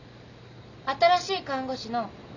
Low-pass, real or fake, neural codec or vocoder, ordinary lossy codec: 7.2 kHz; real; none; none